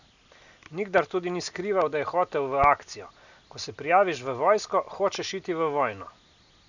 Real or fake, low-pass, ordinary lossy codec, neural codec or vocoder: real; 7.2 kHz; none; none